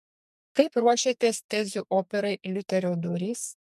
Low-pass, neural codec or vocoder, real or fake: 14.4 kHz; codec, 44.1 kHz, 2.6 kbps, SNAC; fake